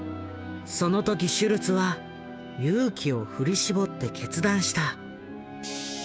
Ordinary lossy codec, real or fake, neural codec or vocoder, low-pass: none; fake; codec, 16 kHz, 6 kbps, DAC; none